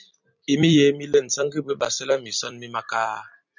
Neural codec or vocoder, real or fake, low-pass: vocoder, 44.1 kHz, 128 mel bands every 256 samples, BigVGAN v2; fake; 7.2 kHz